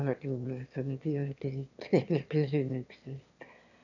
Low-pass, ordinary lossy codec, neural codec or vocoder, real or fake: 7.2 kHz; none; autoencoder, 22.05 kHz, a latent of 192 numbers a frame, VITS, trained on one speaker; fake